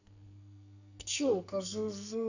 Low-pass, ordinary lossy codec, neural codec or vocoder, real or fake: 7.2 kHz; none; codec, 44.1 kHz, 2.6 kbps, SNAC; fake